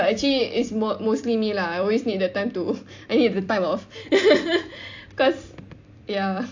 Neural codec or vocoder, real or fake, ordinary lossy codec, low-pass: vocoder, 44.1 kHz, 128 mel bands every 256 samples, BigVGAN v2; fake; AAC, 48 kbps; 7.2 kHz